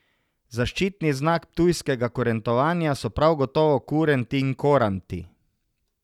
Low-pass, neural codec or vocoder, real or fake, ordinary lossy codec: 19.8 kHz; none; real; none